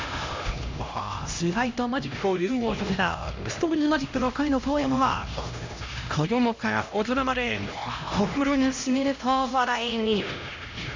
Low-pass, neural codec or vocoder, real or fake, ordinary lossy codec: 7.2 kHz; codec, 16 kHz, 1 kbps, X-Codec, HuBERT features, trained on LibriSpeech; fake; MP3, 64 kbps